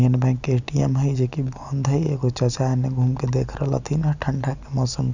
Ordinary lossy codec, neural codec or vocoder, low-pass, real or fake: none; none; 7.2 kHz; real